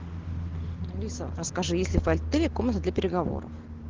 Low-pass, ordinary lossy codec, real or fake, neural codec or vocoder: 7.2 kHz; Opus, 16 kbps; real; none